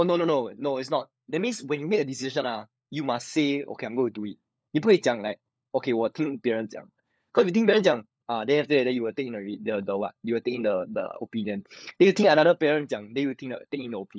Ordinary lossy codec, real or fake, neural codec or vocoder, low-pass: none; fake; codec, 16 kHz, 4 kbps, FunCodec, trained on LibriTTS, 50 frames a second; none